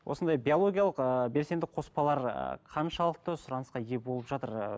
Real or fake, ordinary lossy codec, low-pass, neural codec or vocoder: real; none; none; none